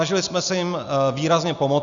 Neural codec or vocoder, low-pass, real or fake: none; 7.2 kHz; real